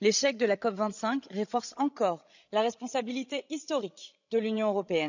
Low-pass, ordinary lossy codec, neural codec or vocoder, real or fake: 7.2 kHz; none; codec, 16 kHz, 16 kbps, FreqCodec, larger model; fake